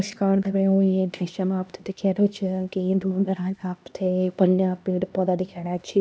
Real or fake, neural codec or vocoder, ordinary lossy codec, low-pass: fake; codec, 16 kHz, 1 kbps, X-Codec, HuBERT features, trained on LibriSpeech; none; none